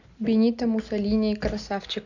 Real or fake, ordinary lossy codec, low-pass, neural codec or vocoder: real; none; 7.2 kHz; none